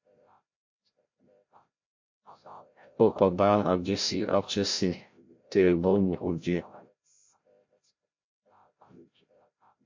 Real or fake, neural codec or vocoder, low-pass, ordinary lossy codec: fake; codec, 16 kHz, 0.5 kbps, FreqCodec, larger model; 7.2 kHz; MP3, 48 kbps